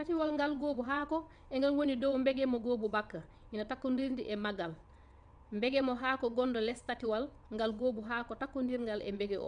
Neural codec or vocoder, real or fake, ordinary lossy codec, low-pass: vocoder, 22.05 kHz, 80 mel bands, WaveNeXt; fake; none; 9.9 kHz